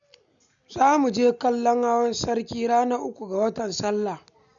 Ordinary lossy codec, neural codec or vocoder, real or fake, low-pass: none; none; real; 7.2 kHz